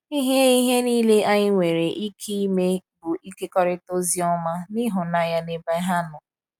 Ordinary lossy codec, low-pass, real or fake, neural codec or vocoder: none; 19.8 kHz; real; none